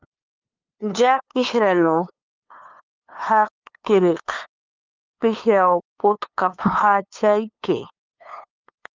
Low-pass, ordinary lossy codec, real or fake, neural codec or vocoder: 7.2 kHz; Opus, 24 kbps; fake; codec, 16 kHz, 2 kbps, FunCodec, trained on LibriTTS, 25 frames a second